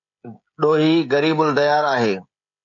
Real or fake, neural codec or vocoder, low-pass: fake; codec, 16 kHz, 16 kbps, FreqCodec, smaller model; 7.2 kHz